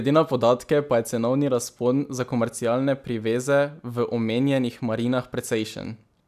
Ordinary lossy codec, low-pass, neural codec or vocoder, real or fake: none; 14.4 kHz; none; real